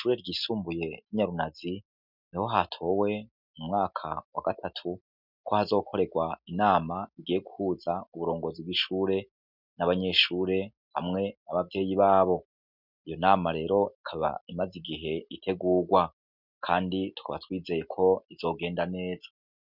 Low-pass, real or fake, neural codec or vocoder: 5.4 kHz; real; none